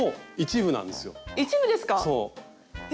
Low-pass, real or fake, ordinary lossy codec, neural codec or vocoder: none; real; none; none